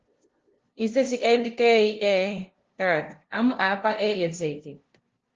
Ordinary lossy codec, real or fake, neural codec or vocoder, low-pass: Opus, 16 kbps; fake; codec, 16 kHz, 0.5 kbps, FunCodec, trained on LibriTTS, 25 frames a second; 7.2 kHz